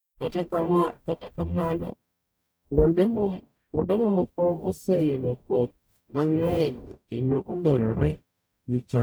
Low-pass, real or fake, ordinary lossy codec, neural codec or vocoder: none; fake; none; codec, 44.1 kHz, 0.9 kbps, DAC